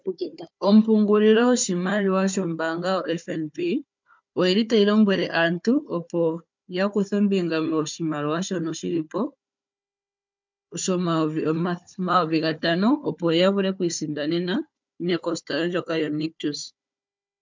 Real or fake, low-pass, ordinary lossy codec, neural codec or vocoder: fake; 7.2 kHz; MP3, 64 kbps; codec, 16 kHz, 4 kbps, FunCodec, trained on Chinese and English, 50 frames a second